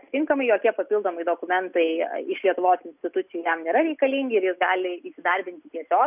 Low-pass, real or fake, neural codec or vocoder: 3.6 kHz; real; none